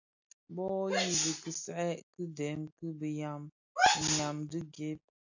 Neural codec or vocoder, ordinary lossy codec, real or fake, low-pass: none; AAC, 48 kbps; real; 7.2 kHz